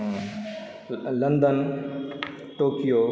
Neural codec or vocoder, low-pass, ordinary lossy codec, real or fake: none; none; none; real